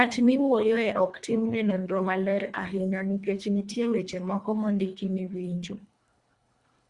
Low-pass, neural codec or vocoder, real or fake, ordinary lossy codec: 10.8 kHz; codec, 24 kHz, 1.5 kbps, HILCodec; fake; Opus, 64 kbps